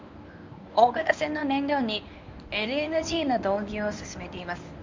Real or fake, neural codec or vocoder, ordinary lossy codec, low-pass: fake; codec, 24 kHz, 0.9 kbps, WavTokenizer, medium speech release version 1; MP3, 64 kbps; 7.2 kHz